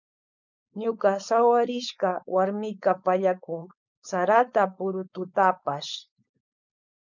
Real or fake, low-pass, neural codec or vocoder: fake; 7.2 kHz; codec, 16 kHz, 4.8 kbps, FACodec